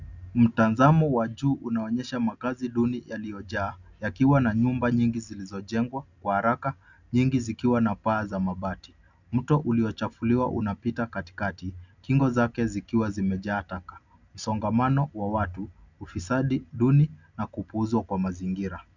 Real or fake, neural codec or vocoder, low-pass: real; none; 7.2 kHz